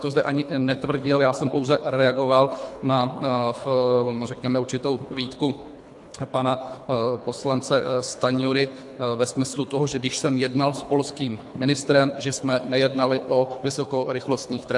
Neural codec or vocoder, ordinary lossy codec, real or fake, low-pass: codec, 24 kHz, 3 kbps, HILCodec; AAC, 64 kbps; fake; 10.8 kHz